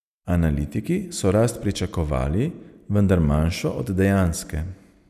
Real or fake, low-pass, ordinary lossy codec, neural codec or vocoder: real; 14.4 kHz; none; none